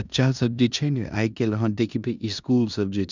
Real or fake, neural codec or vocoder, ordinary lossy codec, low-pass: fake; codec, 16 kHz in and 24 kHz out, 0.9 kbps, LongCat-Audio-Codec, four codebook decoder; none; 7.2 kHz